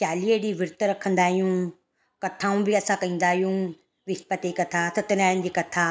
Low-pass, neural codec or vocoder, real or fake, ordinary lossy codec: none; none; real; none